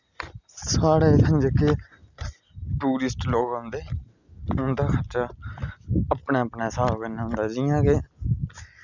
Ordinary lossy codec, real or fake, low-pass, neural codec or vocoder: none; real; 7.2 kHz; none